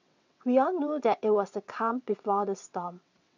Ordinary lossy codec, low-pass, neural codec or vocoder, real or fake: none; 7.2 kHz; vocoder, 22.05 kHz, 80 mel bands, WaveNeXt; fake